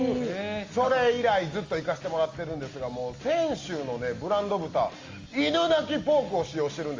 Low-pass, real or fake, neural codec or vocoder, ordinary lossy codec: 7.2 kHz; real; none; Opus, 32 kbps